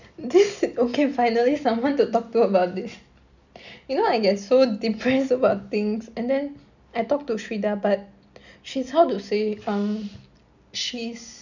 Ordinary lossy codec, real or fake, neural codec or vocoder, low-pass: none; real; none; 7.2 kHz